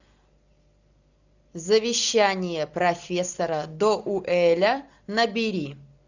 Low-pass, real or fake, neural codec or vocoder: 7.2 kHz; real; none